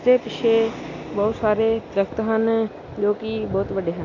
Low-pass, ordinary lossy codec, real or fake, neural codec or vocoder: 7.2 kHz; AAC, 32 kbps; real; none